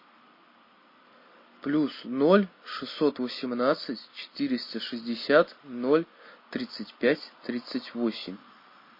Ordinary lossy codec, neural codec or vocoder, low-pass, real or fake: MP3, 24 kbps; none; 5.4 kHz; real